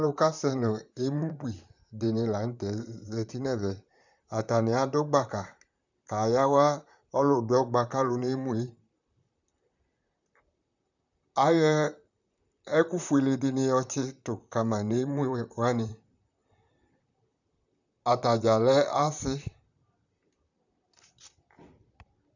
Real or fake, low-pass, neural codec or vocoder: fake; 7.2 kHz; vocoder, 44.1 kHz, 128 mel bands, Pupu-Vocoder